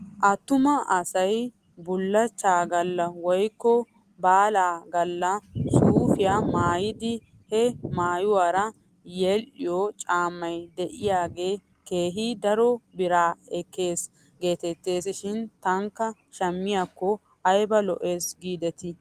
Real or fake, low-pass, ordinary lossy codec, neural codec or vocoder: real; 14.4 kHz; Opus, 24 kbps; none